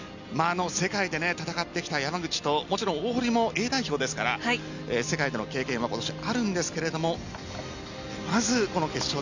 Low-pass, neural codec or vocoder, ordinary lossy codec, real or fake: 7.2 kHz; none; none; real